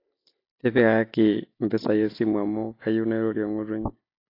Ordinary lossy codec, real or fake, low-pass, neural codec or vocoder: AAC, 32 kbps; real; 5.4 kHz; none